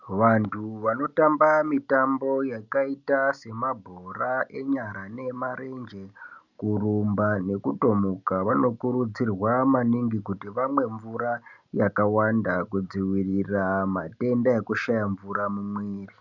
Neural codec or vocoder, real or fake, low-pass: none; real; 7.2 kHz